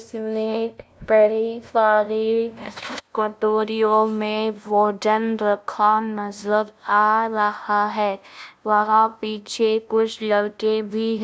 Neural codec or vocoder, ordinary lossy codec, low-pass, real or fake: codec, 16 kHz, 0.5 kbps, FunCodec, trained on LibriTTS, 25 frames a second; none; none; fake